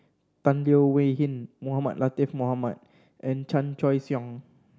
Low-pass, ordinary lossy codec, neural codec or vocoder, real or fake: none; none; none; real